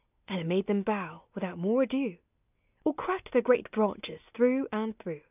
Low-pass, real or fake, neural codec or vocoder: 3.6 kHz; real; none